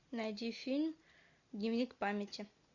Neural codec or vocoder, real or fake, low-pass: none; real; 7.2 kHz